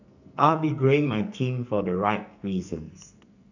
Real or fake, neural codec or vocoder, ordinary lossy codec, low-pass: fake; codec, 44.1 kHz, 2.6 kbps, SNAC; none; 7.2 kHz